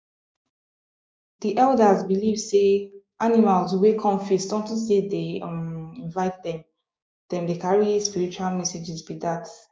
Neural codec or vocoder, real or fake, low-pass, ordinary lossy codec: codec, 16 kHz, 6 kbps, DAC; fake; none; none